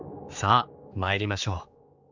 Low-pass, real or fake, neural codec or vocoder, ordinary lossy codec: 7.2 kHz; fake; codec, 16 kHz, 4 kbps, X-Codec, HuBERT features, trained on general audio; Opus, 64 kbps